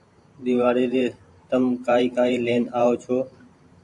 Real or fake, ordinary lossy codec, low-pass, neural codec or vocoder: fake; AAC, 64 kbps; 10.8 kHz; vocoder, 44.1 kHz, 128 mel bands every 512 samples, BigVGAN v2